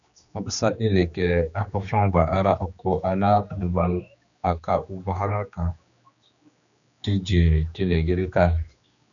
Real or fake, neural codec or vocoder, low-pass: fake; codec, 16 kHz, 2 kbps, X-Codec, HuBERT features, trained on general audio; 7.2 kHz